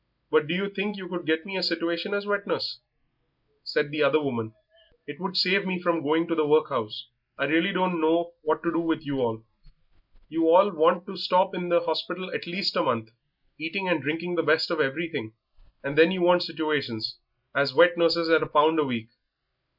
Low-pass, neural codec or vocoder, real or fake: 5.4 kHz; none; real